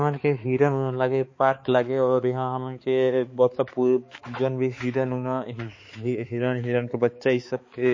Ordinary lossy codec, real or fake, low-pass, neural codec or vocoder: MP3, 32 kbps; fake; 7.2 kHz; codec, 16 kHz, 4 kbps, X-Codec, HuBERT features, trained on balanced general audio